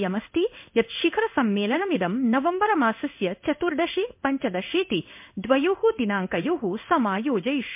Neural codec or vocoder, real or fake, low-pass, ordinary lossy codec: codec, 16 kHz in and 24 kHz out, 1 kbps, XY-Tokenizer; fake; 3.6 kHz; MP3, 32 kbps